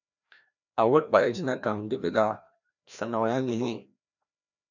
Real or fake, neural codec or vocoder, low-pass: fake; codec, 16 kHz, 1 kbps, FreqCodec, larger model; 7.2 kHz